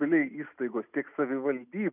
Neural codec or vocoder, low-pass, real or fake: none; 3.6 kHz; real